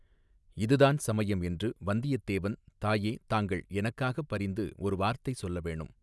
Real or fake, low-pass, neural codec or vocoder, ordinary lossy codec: real; none; none; none